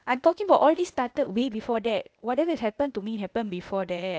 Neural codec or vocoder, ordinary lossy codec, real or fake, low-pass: codec, 16 kHz, 0.8 kbps, ZipCodec; none; fake; none